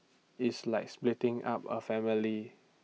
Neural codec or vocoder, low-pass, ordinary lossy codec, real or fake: none; none; none; real